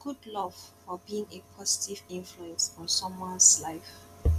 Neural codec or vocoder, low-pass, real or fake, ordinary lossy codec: none; 14.4 kHz; real; none